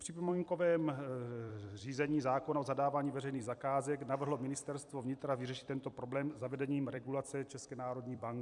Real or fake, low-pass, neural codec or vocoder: fake; 10.8 kHz; vocoder, 44.1 kHz, 128 mel bands every 256 samples, BigVGAN v2